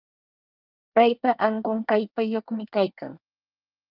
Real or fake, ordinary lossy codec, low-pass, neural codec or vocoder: fake; Opus, 24 kbps; 5.4 kHz; codec, 24 kHz, 1 kbps, SNAC